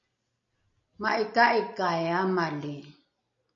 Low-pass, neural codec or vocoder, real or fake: 7.2 kHz; none; real